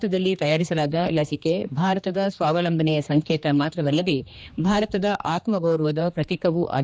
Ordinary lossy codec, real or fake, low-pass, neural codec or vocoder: none; fake; none; codec, 16 kHz, 2 kbps, X-Codec, HuBERT features, trained on general audio